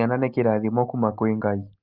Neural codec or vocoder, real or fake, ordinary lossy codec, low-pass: none; real; Opus, 32 kbps; 5.4 kHz